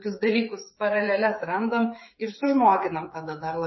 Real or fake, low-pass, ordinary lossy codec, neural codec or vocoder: fake; 7.2 kHz; MP3, 24 kbps; codec, 16 kHz, 8 kbps, FreqCodec, smaller model